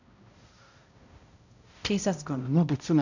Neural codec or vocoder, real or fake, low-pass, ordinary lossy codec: codec, 16 kHz, 0.5 kbps, X-Codec, HuBERT features, trained on balanced general audio; fake; 7.2 kHz; none